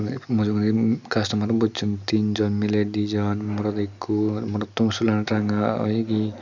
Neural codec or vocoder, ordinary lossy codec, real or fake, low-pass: none; none; real; 7.2 kHz